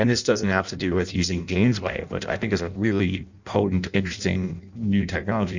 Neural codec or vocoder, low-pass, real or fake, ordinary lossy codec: codec, 16 kHz in and 24 kHz out, 0.6 kbps, FireRedTTS-2 codec; 7.2 kHz; fake; Opus, 64 kbps